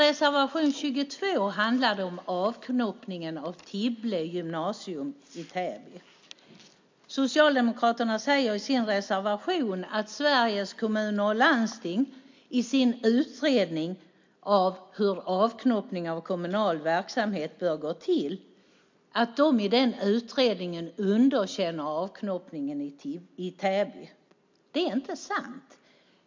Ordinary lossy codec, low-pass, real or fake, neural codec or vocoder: MP3, 64 kbps; 7.2 kHz; real; none